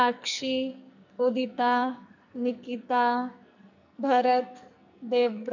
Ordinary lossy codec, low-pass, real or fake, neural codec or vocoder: none; 7.2 kHz; fake; codec, 44.1 kHz, 2.6 kbps, SNAC